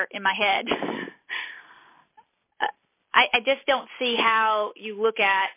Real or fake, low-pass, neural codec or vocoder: real; 3.6 kHz; none